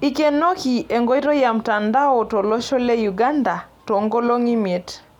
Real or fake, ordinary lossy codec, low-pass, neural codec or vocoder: real; none; 19.8 kHz; none